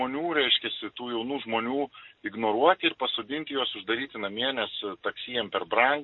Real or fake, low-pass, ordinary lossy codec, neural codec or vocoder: real; 7.2 kHz; MP3, 32 kbps; none